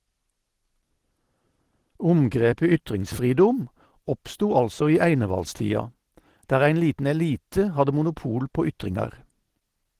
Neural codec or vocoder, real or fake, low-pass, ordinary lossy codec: none; real; 14.4 kHz; Opus, 16 kbps